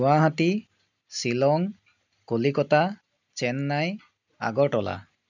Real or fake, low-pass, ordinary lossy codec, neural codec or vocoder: real; 7.2 kHz; none; none